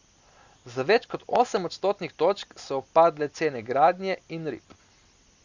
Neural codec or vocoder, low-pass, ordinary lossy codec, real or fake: none; 7.2 kHz; Opus, 64 kbps; real